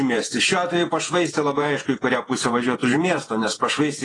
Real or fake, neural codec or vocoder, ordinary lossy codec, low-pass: fake; vocoder, 48 kHz, 128 mel bands, Vocos; AAC, 32 kbps; 10.8 kHz